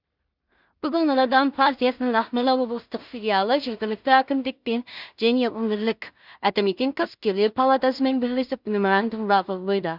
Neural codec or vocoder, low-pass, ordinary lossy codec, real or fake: codec, 16 kHz in and 24 kHz out, 0.4 kbps, LongCat-Audio-Codec, two codebook decoder; 5.4 kHz; Opus, 64 kbps; fake